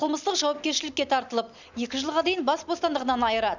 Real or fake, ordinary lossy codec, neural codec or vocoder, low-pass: real; none; none; 7.2 kHz